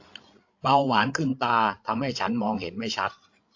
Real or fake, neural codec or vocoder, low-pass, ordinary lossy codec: fake; codec, 16 kHz, 8 kbps, FreqCodec, larger model; 7.2 kHz; AAC, 48 kbps